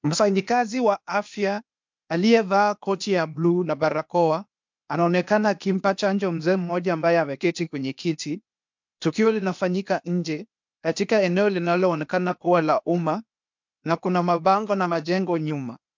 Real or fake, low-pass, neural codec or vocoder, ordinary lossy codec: fake; 7.2 kHz; codec, 16 kHz, 0.8 kbps, ZipCodec; MP3, 64 kbps